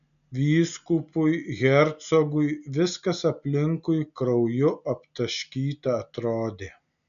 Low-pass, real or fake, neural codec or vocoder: 7.2 kHz; real; none